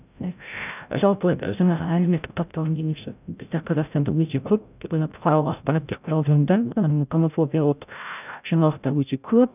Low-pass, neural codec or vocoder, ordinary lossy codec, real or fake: 3.6 kHz; codec, 16 kHz, 0.5 kbps, FreqCodec, larger model; none; fake